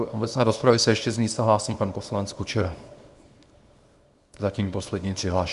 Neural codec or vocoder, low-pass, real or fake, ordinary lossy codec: codec, 24 kHz, 0.9 kbps, WavTokenizer, small release; 10.8 kHz; fake; Opus, 64 kbps